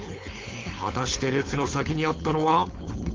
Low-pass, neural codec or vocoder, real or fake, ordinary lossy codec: 7.2 kHz; codec, 16 kHz, 4.8 kbps, FACodec; fake; Opus, 32 kbps